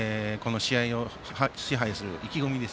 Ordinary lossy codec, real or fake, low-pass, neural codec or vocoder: none; real; none; none